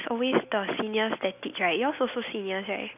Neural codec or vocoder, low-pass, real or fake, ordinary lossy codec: none; 3.6 kHz; real; none